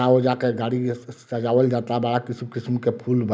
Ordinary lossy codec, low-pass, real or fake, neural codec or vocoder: none; none; real; none